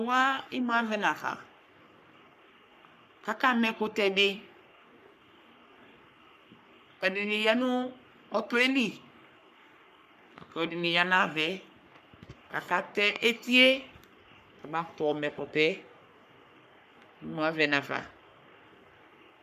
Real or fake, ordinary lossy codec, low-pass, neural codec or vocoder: fake; MP3, 96 kbps; 14.4 kHz; codec, 44.1 kHz, 3.4 kbps, Pupu-Codec